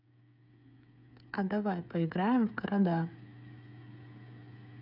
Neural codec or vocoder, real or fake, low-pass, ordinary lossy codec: codec, 16 kHz, 8 kbps, FreqCodec, smaller model; fake; 5.4 kHz; none